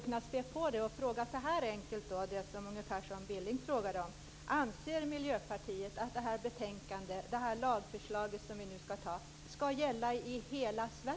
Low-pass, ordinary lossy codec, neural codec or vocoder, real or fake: none; none; none; real